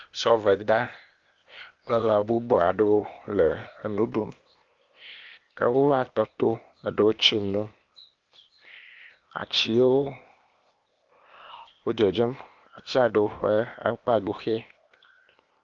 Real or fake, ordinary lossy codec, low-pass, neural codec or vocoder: fake; Opus, 32 kbps; 7.2 kHz; codec, 16 kHz, 0.8 kbps, ZipCodec